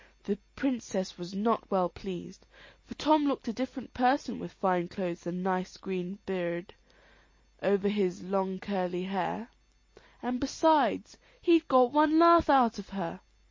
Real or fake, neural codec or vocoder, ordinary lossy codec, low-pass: real; none; MP3, 32 kbps; 7.2 kHz